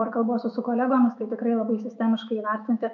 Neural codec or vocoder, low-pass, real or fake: autoencoder, 48 kHz, 128 numbers a frame, DAC-VAE, trained on Japanese speech; 7.2 kHz; fake